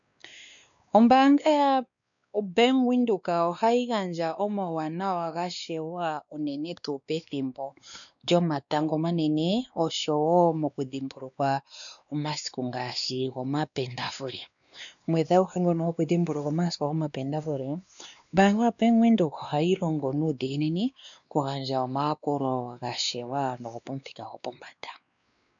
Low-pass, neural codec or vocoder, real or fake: 7.2 kHz; codec, 16 kHz, 2 kbps, X-Codec, WavLM features, trained on Multilingual LibriSpeech; fake